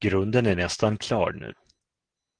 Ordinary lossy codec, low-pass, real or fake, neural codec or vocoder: Opus, 16 kbps; 9.9 kHz; real; none